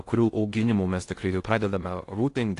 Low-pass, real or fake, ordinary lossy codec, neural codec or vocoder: 10.8 kHz; fake; AAC, 48 kbps; codec, 16 kHz in and 24 kHz out, 0.6 kbps, FocalCodec, streaming, 2048 codes